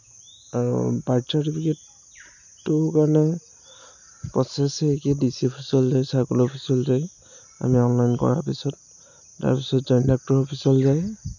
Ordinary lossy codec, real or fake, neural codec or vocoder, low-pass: none; real; none; 7.2 kHz